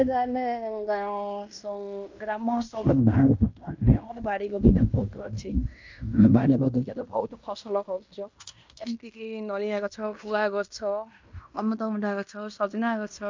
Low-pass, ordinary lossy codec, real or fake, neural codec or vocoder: 7.2 kHz; MP3, 64 kbps; fake; codec, 16 kHz in and 24 kHz out, 0.9 kbps, LongCat-Audio-Codec, fine tuned four codebook decoder